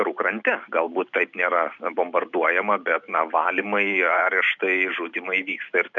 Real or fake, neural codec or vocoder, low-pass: real; none; 7.2 kHz